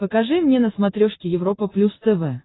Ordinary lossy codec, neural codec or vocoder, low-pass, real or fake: AAC, 16 kbps; none; 7.2 kHz; real